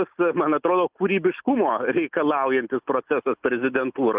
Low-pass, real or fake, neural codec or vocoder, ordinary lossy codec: 3.6 kHz; real; none; Opus, 24 kbps